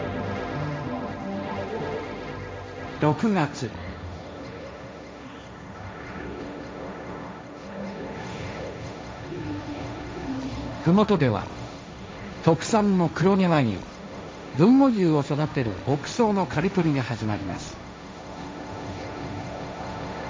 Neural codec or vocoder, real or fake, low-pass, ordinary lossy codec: codec, 16 kHz, 1.1 kbps, Voila-Tokenizer; fake; none; none